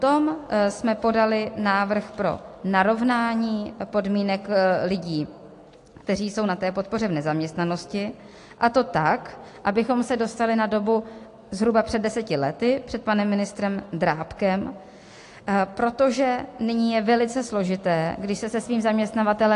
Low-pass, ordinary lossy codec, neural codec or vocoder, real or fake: 10.8 kHz; AAC, 48 kbps; none; real